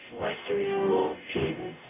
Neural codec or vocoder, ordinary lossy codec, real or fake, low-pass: codec, 44.1 kHz, 0.9 kbps, DAC; none; fake; 3.6 kHz